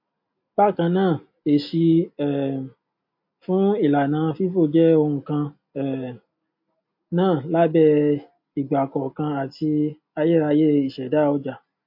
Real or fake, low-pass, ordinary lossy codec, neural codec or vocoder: real; 5.4 kHz; MP3, 32 kbps; none